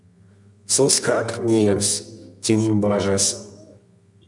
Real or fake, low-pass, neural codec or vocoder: fake; 10.8 kHz; codec, 24 kHz, 0.9 kbps, WavTokenizer, medium music audio release